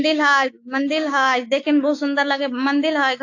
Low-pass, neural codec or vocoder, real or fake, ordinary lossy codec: 7.2 kHz; codec, 16 kHz, 6 kbps, DAC; fake; AAC, 48 kbps